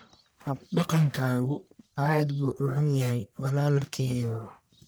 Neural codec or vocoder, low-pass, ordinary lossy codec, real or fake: codec, 44.1 kHz, 1.7 kbps, Pupu-Codec; none; none; fake